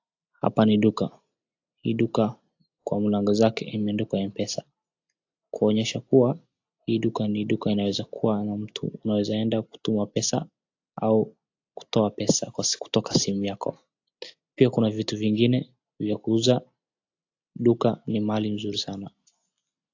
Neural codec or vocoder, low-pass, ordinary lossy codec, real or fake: none; 7.2 kHz; AAC, 48 kbps; real